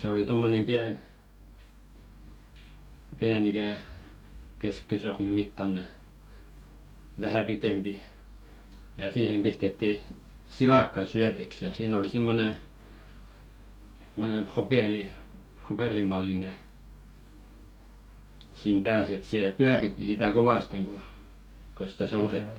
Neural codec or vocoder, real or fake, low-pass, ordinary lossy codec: codec, 44.1 kHz, 2.6 kbps, DAC; fake; 19.8 kHz; none